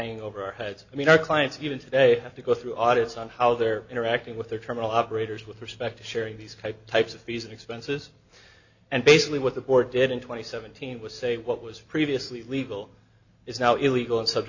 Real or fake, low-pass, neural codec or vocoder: real; 7.2 kHz; none